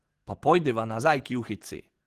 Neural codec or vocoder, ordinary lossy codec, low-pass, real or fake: codec, 44.1 kHz, 7.8 kbps, DAC; Opus, 16 kbps; 14.4 kHz; fake